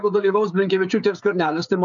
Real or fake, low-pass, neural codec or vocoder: fake; 7.2 kHz; codec, 16 kHz, 16 kbps, FreqCodec, smaller model